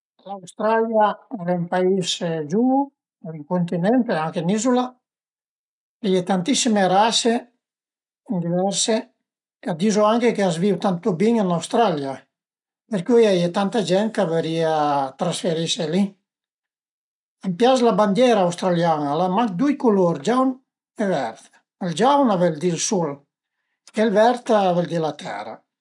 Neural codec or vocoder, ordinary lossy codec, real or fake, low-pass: none; none; real; 10.8 kHz